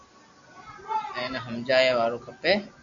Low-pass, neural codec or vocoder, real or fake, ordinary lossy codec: 7.2 kHz; none; real; AAC, 64 kbps